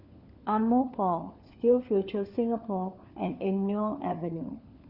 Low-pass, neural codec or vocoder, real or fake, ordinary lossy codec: 5.4 kHz; codec, 16 kHz, 4 kbps, FunCodec, trained on LibriTTS, 50 frames a second; fake; Opus, 64 kbps